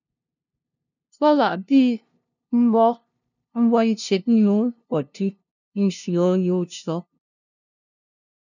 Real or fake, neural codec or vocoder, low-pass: fake; codec, 16 kHz, 0.5 kbps, FunCodec, trained on LibriTTS, 25 frames a second; 7.2 kHz